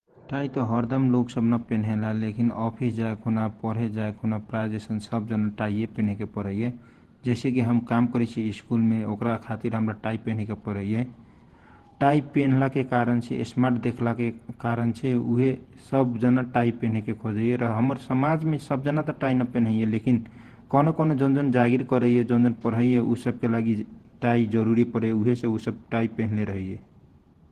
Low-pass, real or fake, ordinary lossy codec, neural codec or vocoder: 14.4 kHz; fake; Opus, 16 kbps; vocoder, 48 kHz, 128 mel bands, Vocos